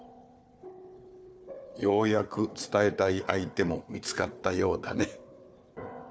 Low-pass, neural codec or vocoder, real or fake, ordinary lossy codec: none; codec, 16 kHz, 4 kbps, FunCodec, trained on Chinese and English, 50 frames a second; fake; none